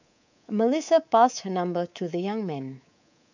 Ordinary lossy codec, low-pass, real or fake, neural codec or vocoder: none; 7.2 kHz; fake; codec, 24 kHz, 3.1 kbps, DualCodec